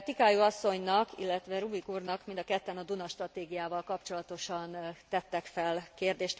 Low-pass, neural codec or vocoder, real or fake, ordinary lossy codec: none; none; real; none